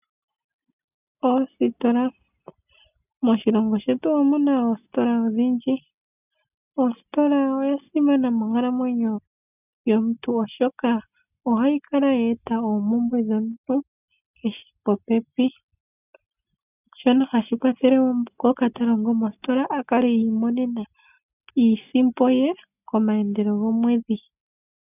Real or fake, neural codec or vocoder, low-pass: real; none; 3.6 kHz